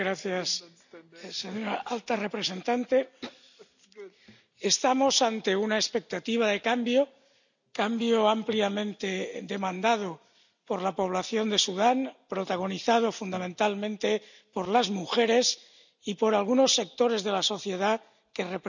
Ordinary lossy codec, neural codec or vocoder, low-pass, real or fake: none; none; 7.2 kHz; real